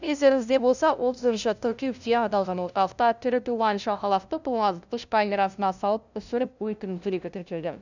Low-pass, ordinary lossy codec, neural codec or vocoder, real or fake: 7.2 kHz; none; codec, 16 kHz, 0.5 kbps, FunCodec, trained on LibriTTS, 25 frames a second; fake